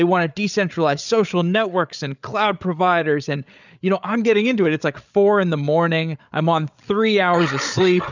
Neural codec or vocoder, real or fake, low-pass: codec, 16 kHz, 8 kbps, FreqCodec, larger model; fake; 7.2 kHz